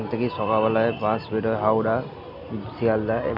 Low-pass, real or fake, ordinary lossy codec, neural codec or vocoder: 5.4 kHz; real; none; none